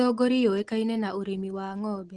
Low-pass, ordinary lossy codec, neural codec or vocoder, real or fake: 10.8 kHz; Opus, 16 kbps; none; real